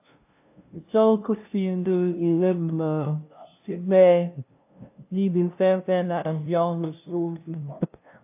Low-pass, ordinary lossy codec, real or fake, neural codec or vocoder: 3.6 kHz; AAC, 32 kbps; fake; codec, 16 kHz, 0.5 kbps, FunCodec, trained on LibriTTS, 25 frames a second